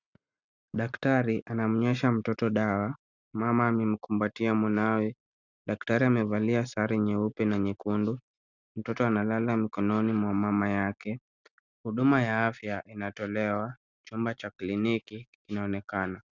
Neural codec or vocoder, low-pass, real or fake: none; 7.2 kHz; real